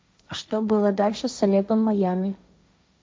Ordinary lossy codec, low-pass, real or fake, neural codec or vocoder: none; none; fake; codec, 16 kHz, 1.1 kbps, Voila-Tokenizer